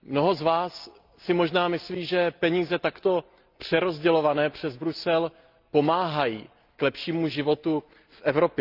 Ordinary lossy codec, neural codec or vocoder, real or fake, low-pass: Opus, 24 kbps; none; real; 5.4 kHz